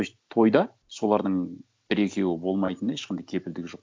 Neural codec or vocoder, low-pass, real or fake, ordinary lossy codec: none; none; real; none